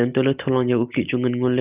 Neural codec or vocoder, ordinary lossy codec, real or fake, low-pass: none; Opus, 32 kbps; real; 3.6 kHz